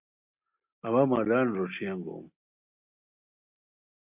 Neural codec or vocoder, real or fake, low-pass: none; real; 3.6 kHz